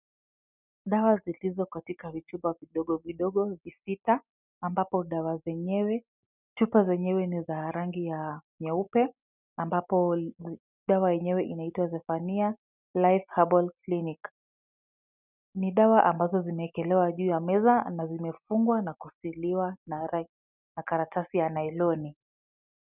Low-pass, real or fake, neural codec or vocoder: 3.6 kHz; real; none